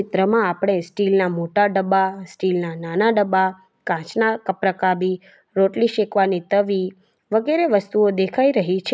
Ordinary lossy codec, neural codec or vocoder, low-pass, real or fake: none; none; none; real